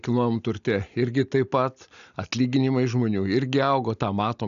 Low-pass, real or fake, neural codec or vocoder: 7.2 kHz; real; none